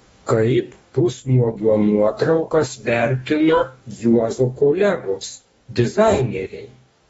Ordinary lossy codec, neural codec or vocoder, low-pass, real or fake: AAC, 24 kbps; codec, 44.1 kHz, 2.6 kbps, DAC; 19.8 kHz; fake